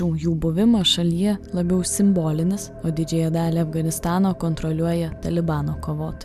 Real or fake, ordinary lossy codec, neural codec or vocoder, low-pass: real; MP3, 96 kbps; none; 14.4 kHz